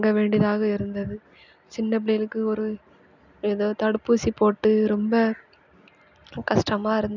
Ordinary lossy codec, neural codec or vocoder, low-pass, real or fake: none; none; 7.2 kHz; real